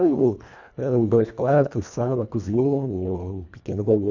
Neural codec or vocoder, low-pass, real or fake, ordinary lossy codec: codec, 24 kHz, 1.5 kbps, HILCodec; 7.2 kHz; fake; none